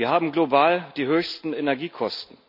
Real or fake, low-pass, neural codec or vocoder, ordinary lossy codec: real; 5.4 kHz; none; none